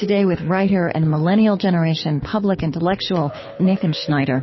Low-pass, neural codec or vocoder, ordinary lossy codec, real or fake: 7.2 kHz; codec, 24 kHz, 6 kbps, HILCodec; MP3, 24 kbps; fake